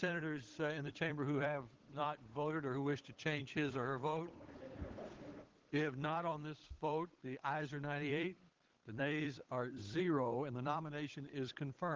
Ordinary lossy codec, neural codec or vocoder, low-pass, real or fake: Opus, 16 kbps; codec, 16 kHz, 8 kbps, FunCodec, trained on Chinese and English, 25 frames a second; 7.2 kHz; fake